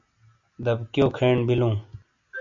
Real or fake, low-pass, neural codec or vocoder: real; 7.2 kHz; none